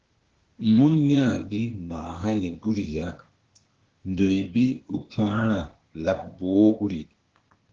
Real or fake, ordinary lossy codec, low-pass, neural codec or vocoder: fake; Opus, 16 kbps; 7.2 kHz; codec, 16 kHz, 0.8 kbps, ZipCodec